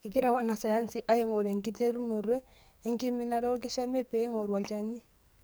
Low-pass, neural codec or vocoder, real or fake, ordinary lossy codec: none; codec, 44.1 kHz, 2.6 kbps, SNAC; fake; none